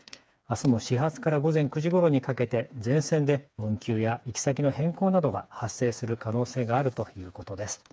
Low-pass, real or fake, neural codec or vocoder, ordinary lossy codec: none; fake; codec, 16 kHz, 4 kbps, FreqCodec, smaller model; none